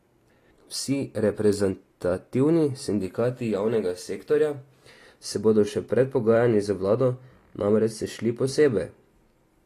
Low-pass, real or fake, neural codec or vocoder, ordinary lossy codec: 14.4 kHz; real; none; AAC, 48 kbps